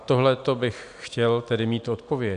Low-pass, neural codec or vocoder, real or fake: 9.9 kHz; none; real